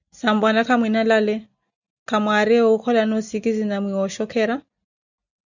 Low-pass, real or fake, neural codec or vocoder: 7.2 kHz; real; none